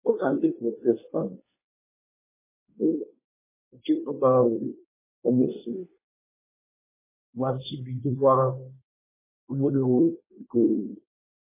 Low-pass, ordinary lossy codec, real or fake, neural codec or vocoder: 3.6 kHz; MP3, 16 kbps; fake; codec, 24 kHz, 1 kbps, SNAC